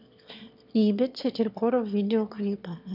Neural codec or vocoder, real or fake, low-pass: autoencoder, 22.05 kHz, a latent of 192 numbers a frame, VITS, trained on one speaker; fake; 5.4 kHz